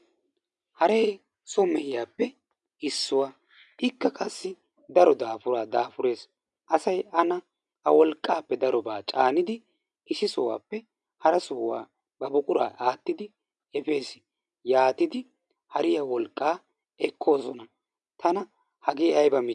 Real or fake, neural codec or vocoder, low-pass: real; none; 10.8 kHz